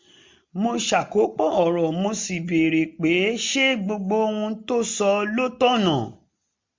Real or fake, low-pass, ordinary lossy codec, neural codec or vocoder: real; 7.2 kHz; MP3, 64 kbps; none